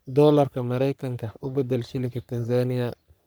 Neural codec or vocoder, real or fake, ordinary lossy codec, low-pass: codec, 44.1 kHz, 3.4 kbps, Pupu-Codec; fake; none; none